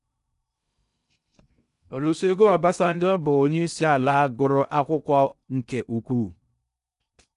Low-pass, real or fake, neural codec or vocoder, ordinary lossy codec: 10.8 kHz; fake; codec, 16 kHz in and 24 kHz out, 0.6 kbps, FocalCodec, streaming, 2048 codes; none